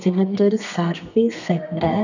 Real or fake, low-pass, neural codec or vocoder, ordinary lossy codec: fake; 7.2 kHz; codec, 32 kHz, 1.9 kbps, SNAC; none